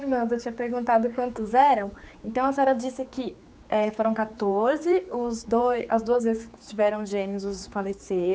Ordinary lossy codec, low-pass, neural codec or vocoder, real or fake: none; none; codec, 16 kHz, 4 kbps, X-Codec, HuBERT features, trained on general audio; fake